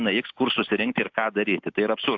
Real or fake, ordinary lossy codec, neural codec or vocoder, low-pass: real; AAC, 48 kbps; none; 7.2 kHz